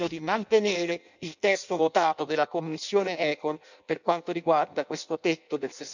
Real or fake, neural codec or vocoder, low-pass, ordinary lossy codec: fake; codec, 16 kHz in and 24 kHz out, 0.6 kbps, FireRedTTS-2 codec; 7.2 kHz; none